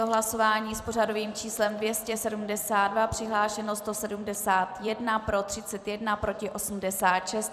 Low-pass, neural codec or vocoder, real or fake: 14.4 kHz; vocoder, 44.1 kHz, 128 mel bands every 256 samples, BigVGAN v2; fake